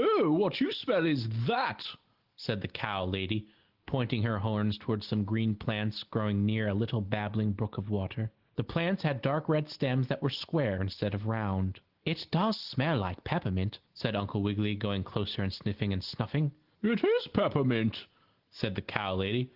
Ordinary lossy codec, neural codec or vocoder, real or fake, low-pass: Opus, 16 kbps; none; real; 5.4 kHz